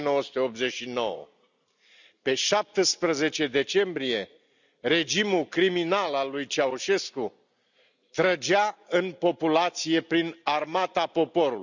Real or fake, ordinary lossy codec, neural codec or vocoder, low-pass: real; none; none; 7.2 kHz